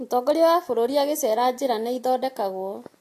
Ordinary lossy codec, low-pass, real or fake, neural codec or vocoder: AAC, 48 kbps; 14.4 kHz; real; none